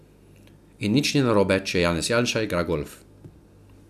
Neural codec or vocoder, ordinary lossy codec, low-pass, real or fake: none; none; 14.4 kHz; real